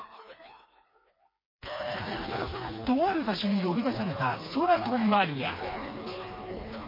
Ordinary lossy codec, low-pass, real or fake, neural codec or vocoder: MP3, 24 kbps; 5.4 kHz; fake; codec, 16 kHz, 2 kbps, FreqCodec, smaller model